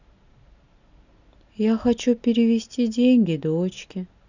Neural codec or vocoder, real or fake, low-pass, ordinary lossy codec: none; real; 7.2 kHz; none